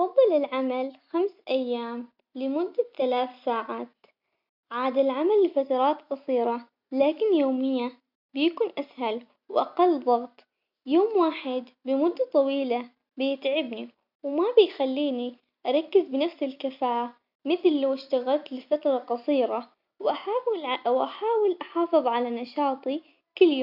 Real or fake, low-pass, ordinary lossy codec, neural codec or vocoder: real; 5.4 kHz; MP3, 48 kbps; none